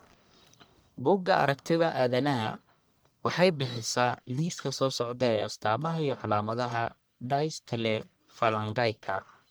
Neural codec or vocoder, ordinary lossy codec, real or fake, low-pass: codec, 44.1 kHz, 1.7 kbps, Pupu-Codec; none; fake; none